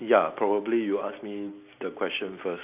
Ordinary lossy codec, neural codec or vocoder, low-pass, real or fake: none; none; 3.6 kHz; real